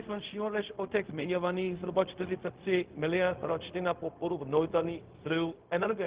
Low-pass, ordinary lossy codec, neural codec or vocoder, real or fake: 3.6 kHz; Opus, 16 kbps; codec, 16 kHz, 0.4 kbps, LongCat-Audio-Codec; fake